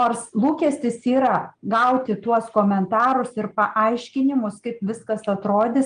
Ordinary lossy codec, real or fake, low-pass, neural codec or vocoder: Opus, 32 kbps; real; 9.9 kHz; none